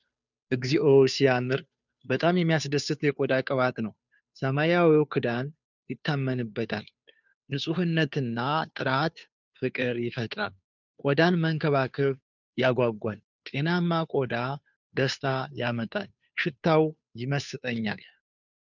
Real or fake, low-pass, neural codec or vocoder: fake; 7.2 kHz; codec, 16 kHz, 2 kbps, FunCodec, trained on Chinese and English, 25 frames a second